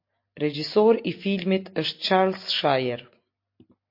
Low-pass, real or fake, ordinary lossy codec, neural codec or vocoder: 5.4 kHz; real; MP3, 32 kbps; none